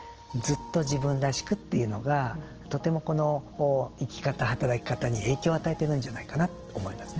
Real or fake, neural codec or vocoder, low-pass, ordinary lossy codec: real; none; 7.2 kHz; Opus, 16 kbps